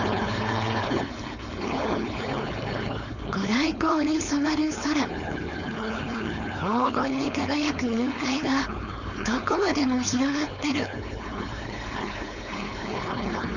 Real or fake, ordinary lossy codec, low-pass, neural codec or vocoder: fake; none; 7.2 kHz; codec, 16 kHz, 4.8 kbps, FACodec